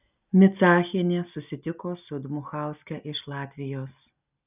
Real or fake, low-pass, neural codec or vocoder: real; 3.6 kHz; none